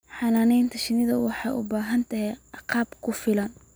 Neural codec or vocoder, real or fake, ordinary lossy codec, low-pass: none; real; none; none